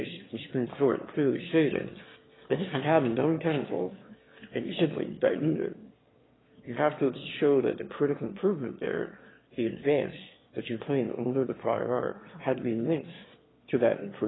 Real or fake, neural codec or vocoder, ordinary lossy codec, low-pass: fake; autoencoder, 22.05 kHz, a latent of 192 numbers a frame, VITS, trained on one speaker; AAC, 16 kbps; 7.2 kHz